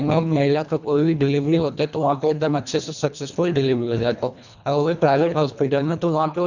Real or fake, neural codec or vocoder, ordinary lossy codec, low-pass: fake; codec, 24 kHz, 1.5 kbps, HILCodec; none; 7.2 kHz